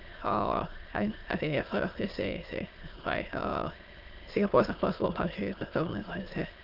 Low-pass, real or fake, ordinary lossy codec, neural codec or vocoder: 5.4 kHz; fake; Opus, 24 kbps; autoencoder, 22.05 kHz, a latent of 192 numbers a frame, VITS, trained on many speakers